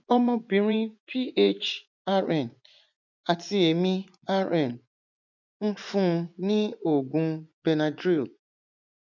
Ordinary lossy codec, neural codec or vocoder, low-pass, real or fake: none; vocoder, 24 kHz, 100 mel bands, Vocos; 7.2 kHz; fake